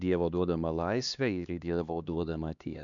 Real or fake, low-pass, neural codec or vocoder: fake; 7.2 kHz; codec, 16 kHz, 2 kbps, X-Codec, HuBERT features, trained on LibriSpeech